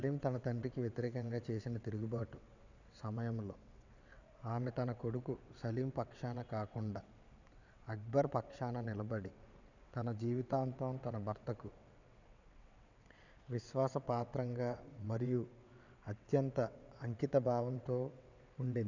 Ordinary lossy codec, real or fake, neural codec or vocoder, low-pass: none; fake; vocoder, 22.05 kHz, 80 mel bands, WaveNeXt; 7.2 kHz